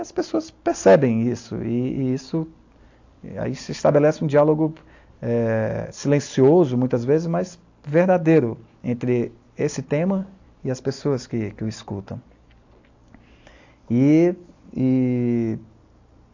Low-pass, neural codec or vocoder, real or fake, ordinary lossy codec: 7.2 kHz; none; real; AAC, 48 kbps